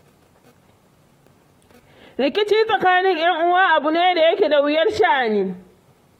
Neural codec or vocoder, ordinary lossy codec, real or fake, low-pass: vocoder, 44.1 kHz, 128 mel bands, Pupu-Vocoder; AAC, 48 kbps; fake; 19.8 kHz